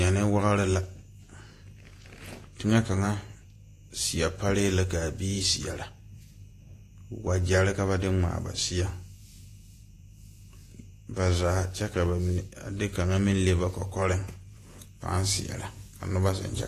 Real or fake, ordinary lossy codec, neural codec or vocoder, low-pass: fake; AAC, 48 kbps; vocoder, 48 kHz, 128 mel bands, Vocos; 14.4 kHz